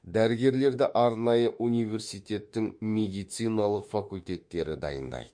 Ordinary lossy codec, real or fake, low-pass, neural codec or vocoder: MP3, 48 kbps; fake; 9.9 kHz; autoencoder, 48 kHz, 32 numbers a frame, DAC-VAE, trained on Japanese speech